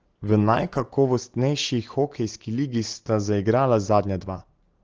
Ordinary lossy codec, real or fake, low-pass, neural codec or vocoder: Opus, 16 kbps; real; 7.2 kHz; none